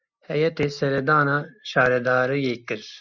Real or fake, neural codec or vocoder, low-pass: real; none; 7.2 kHz